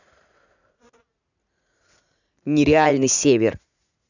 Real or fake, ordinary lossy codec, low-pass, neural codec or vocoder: fake; none; 7.2 kHz; vocoder, 44.1 kHz, 80 mel bands, Vocos